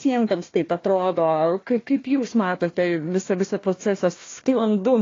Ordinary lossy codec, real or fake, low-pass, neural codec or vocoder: AAC, 32 kbps; fake; 7.2 kHz; codec, 16 kHz, 1 kbps, FunCodec, trained on Chinese and English, 50 frames a second